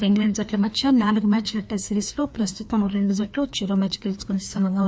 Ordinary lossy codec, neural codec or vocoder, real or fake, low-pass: none; codec, 16 kHz, 2 kbps, FreqCodec, larger model; fake; none